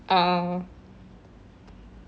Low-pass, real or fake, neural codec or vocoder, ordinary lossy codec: none; real; none; none